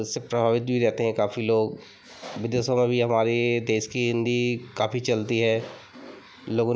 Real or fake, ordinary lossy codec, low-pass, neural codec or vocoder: real; none; none; none